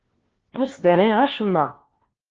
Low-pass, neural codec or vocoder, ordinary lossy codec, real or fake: 7.2 kHz; codec, 16 kHz, 1 kbps, FunCodec, trained on LibriTTS, 50 frames a second; Opus, 16 kbps; fake